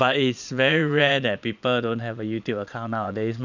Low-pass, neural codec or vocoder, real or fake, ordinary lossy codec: 7.2 kHz; vocoder, 22.05 kHz, 80 mel bands, Vocos; fake; none